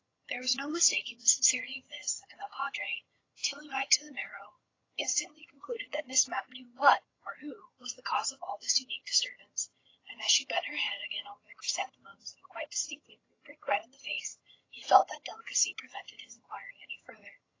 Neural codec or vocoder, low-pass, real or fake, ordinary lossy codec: vocoder, 22.05 kHz, 80 mel bands, HiFi-GAN; 7.2 kHz; fake; AAC, 32 kbps